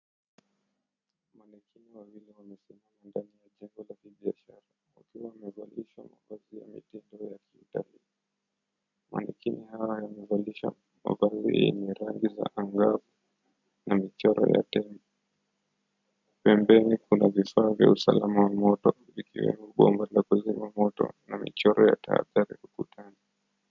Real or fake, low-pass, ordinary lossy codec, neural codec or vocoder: real; 7.2 kHz; MP3, 64 kbps; none